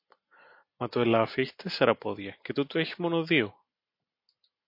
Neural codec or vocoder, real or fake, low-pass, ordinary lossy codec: none; real; 5.4 kHz; MP3, 32 kbps